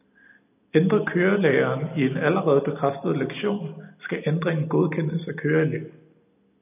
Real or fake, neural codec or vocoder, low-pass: real; none; 3.6 kHz